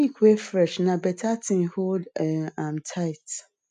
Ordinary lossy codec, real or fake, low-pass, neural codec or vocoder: none; real; 9.9 kHz; none